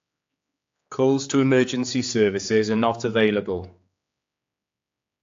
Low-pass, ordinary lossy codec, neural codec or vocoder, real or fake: 7.2 kHz; AAC, 48 kbps; codec, 16 kHz, 4 kbps, X-Codec, HuBERT features, trained on general audio; fake